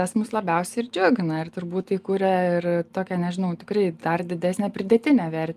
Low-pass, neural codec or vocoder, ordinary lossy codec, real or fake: 14.4 kHz; none; Opus, 32 kbps; real